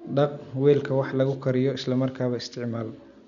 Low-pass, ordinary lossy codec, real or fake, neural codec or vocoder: 7.2 kHz; none; real; none